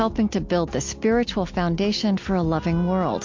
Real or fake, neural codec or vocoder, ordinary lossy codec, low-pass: real; none; MP3, 48 kbps; 7.2 kHz